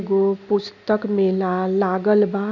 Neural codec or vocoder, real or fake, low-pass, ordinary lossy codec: none; real; 7.2 kHz; none